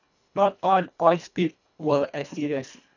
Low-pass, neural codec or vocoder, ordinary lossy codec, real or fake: 7.2 kHz; codec, 24 kHz, 1.5 kbps, HILCodec; none; fake